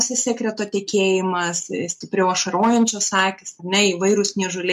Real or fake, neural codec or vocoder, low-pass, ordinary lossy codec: real; none; 14.4 kHz; MP3, 64 kbps